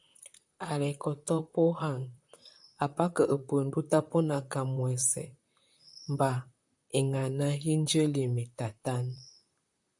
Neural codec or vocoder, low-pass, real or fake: vocoder, 44.1 kHz, 128 mel bands, Pupu-Vocoder; 10.8 kHz; fake